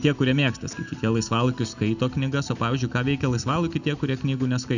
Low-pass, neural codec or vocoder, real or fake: 7.2 kHz; none; real